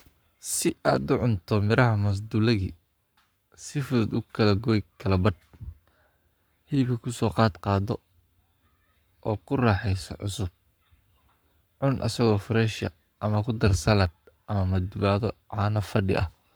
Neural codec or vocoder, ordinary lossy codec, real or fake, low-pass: codec, 44.1 kHz, 7.8 kbps, Pupu-Codec; none; fake; none